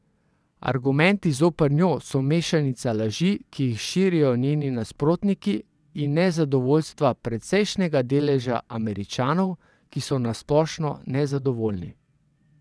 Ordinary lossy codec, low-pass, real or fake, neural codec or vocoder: none; none; fake; vocoder, 22.05 kHz, 80 mel bands, WaveNeXt